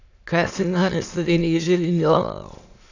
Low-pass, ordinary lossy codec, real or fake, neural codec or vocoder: 7.2 kHz; AAC, 48 kbps; fake; autoencoder, 22.05 kHz, a latent of 192 numbers a frame, VITS, trained on many speakers